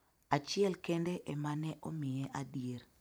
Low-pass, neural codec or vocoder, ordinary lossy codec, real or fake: none; vocoder, 44.1 kHz, 128 mel bands every 512 samples, BigVGAN v2; none; fake